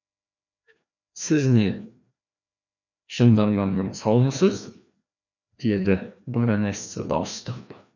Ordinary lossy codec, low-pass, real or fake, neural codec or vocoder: none; 7.2 kHz; fake; codec, 16 kHz, 1 kbps, FreqCodec, larger model